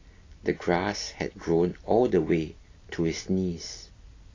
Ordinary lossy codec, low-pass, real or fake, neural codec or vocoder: AAC, 32 kbps; 7.2 kHz; real; none